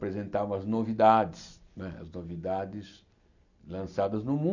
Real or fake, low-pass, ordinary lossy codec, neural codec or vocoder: real; 7.2 kHz; none; none